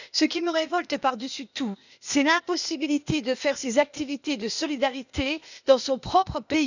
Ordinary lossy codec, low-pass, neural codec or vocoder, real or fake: none; 7.2 kHz; codec, 16 kHz, 0.8 kbps, ZipCodec; fake